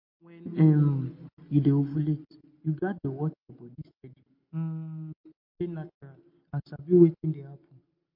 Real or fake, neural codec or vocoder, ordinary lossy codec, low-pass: real; none; MP3, 32 kbps; 5.4 kHz